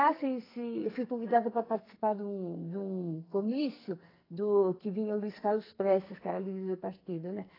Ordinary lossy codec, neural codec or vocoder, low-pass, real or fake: AAC, 24 kbps; codec, 44.1 kHz, 2.6 kbps, SNAC; 5.4 kHz; fake